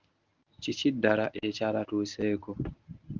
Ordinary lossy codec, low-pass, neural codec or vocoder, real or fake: Opus, 24 kbps; 7.2 kHz; codec, 24 kHz, 0.9 kbps, WavTokenizer, medium speech release version 1; fake